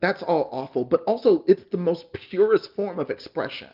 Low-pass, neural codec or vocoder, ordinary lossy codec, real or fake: 5.4 kHz; none; Opus, 24 kbps; real